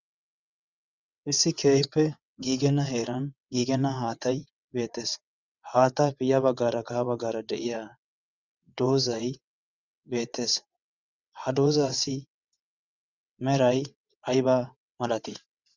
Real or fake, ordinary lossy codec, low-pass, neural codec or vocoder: fake; Opus, 64 kbps; 7.2 kHz; vocoder, 22.05 kHz, 80 mel bands, WaveNeXt